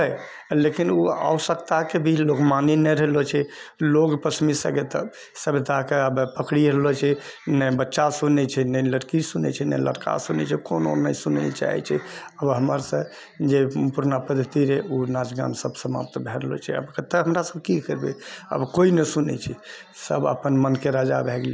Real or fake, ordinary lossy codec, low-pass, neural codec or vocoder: real; none; none; none